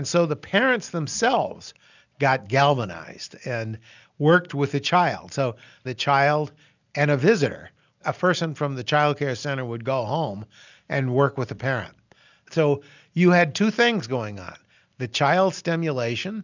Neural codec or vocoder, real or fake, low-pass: none; real; 7.2 kHz